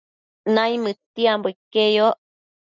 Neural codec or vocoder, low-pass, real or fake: none; 7.2 kHz; real